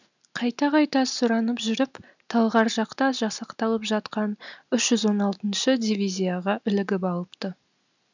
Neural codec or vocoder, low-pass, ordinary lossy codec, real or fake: none; 7.2 kHz; none; real